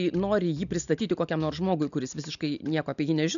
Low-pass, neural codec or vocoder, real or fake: 7.2 kHz; none; real